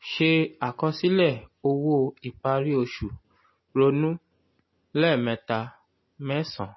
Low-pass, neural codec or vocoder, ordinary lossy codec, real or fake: 7.2 kHz; none; MP3, 24 kbps; real